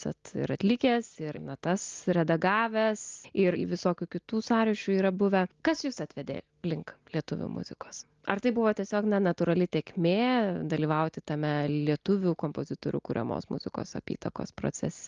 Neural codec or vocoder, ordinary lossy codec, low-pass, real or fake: none; Opus, 32 kbps; 7.2 kHz; real